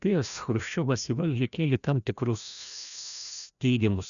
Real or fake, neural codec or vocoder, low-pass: fake; codec, 16 kHz, 1 kbps, FreqCodec, larger model; 7.2 kHz